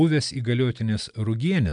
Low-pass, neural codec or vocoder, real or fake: 9.9 kHz; none; real